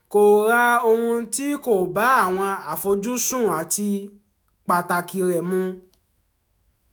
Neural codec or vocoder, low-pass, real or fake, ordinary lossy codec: autoencoder, 48 kHz, 128 numbers a frame, DAC-VAE, trained on Japanese speech; none; fake; none